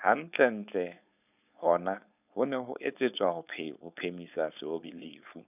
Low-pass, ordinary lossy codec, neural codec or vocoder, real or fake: 3.6 kHz; none; codec, 16 kHz, 4.8 kbps, FACodec; fake